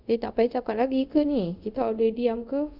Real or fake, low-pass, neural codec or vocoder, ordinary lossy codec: fake; 5.4 kHz; codec, 24 kHz, 0.5 kbps, DualCodec; none